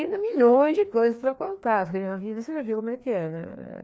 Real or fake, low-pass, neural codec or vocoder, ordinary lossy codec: fake; none; codec, 16 kHz, 2 kbps, FreqCodec, larger model; none